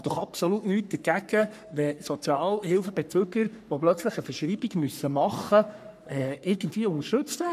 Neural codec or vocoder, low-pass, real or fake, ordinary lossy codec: codec, 44.1 kHz, 3.4 kbps, Pupu-Codec; 14.4 kHz; fake; none